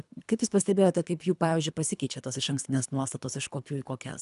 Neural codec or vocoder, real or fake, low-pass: codec, 24 kHz, 3 kbps, HILCodec; fake; 10.8 kHz